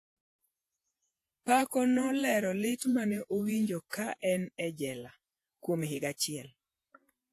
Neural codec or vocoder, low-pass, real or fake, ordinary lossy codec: vocoder, 48 kHz, 128 mel bands, Vocos; 14.4 kHz; fake; AAC, 64 kbps